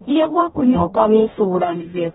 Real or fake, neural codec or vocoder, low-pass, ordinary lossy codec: fake; codec, 44.1 kHz, 0.9 kbps, DAC; 19.8 kHz; AAC, 16 kbps